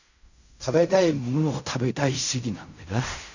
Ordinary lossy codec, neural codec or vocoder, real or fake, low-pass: none; codec, 16 kHz in and 24 kHz out, 0.4 kbps, LongCat-Audio-Codec, fine tuned four codebook decoder; fake; 7.2 kHz